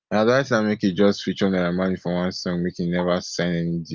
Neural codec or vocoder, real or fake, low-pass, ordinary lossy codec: none; real; 7.2 kHz; Opus, 24 kbps